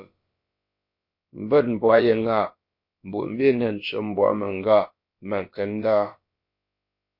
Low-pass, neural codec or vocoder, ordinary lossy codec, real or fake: 5.4 kHz; codec, 16 kHz, about 1 kbps, DyCAST, with the encoder's durations; MP3, 32 kbps; fake